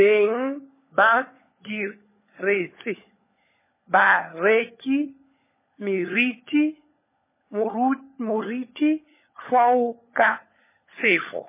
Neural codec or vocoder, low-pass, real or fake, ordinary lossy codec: codec, 16 kHz, 16 kbps, FunCodec, trained on LibriTTS, 50 frames a second; 3.6 kHz; fake; MP3, 16 kbps